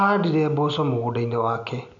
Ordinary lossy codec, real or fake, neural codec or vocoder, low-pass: none; real; none; 7.2 kHz